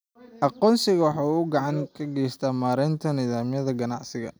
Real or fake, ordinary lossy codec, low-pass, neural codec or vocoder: real; none; none; none